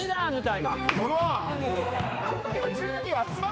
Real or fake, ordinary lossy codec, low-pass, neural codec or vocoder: fake; none; none; codec, 16 kHz, 2 kbps, X-Codec, HuBERT features, trained on general audio